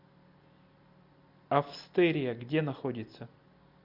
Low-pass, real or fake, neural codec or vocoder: 5.4 kHz; real; none